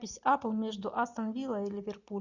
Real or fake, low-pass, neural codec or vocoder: real; 7.2 kHz; none